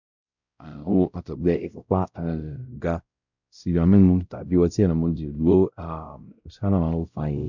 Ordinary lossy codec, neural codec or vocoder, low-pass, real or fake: none; codec, 16 kHz, 0.5 kbps, X-Codec, WavLM features, trained on Multilingual LibriSpeech; 7.2 kHz; fake